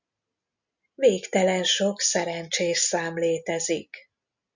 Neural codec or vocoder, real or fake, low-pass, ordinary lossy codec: none; real; 7.2 kHz; Opus, 64 kbps